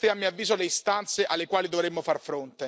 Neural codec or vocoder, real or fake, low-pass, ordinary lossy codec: none; real; none; none